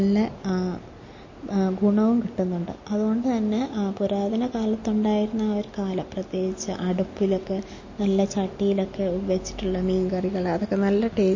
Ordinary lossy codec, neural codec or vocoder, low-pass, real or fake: MP3, 32 kbps; none; 7.2 kHz; real